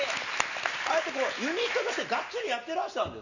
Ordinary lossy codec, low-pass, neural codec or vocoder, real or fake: none; 7.2 kHz; none; real